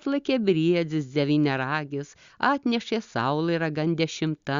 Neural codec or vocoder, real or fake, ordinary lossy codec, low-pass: none; real; Opus, 64 kbps; 7.2 kHz